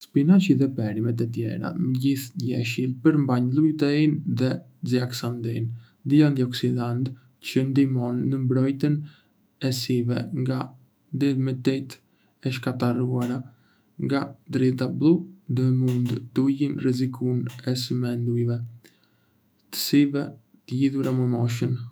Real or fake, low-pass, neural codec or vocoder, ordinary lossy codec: fake; none; autoencoder, 48 kHz, 128 numbers a frame, DAC-VAE, trained on Japanese speech; none